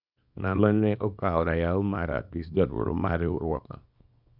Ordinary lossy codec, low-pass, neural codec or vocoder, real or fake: none; 5.4 kHz; codec, 24 kHz, 0.9 kbps, WavTokenizer, small release; fake